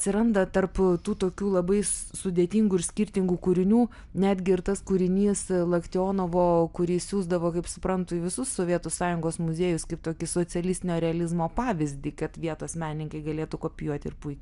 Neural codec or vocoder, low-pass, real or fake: none; 10.8 kHz; real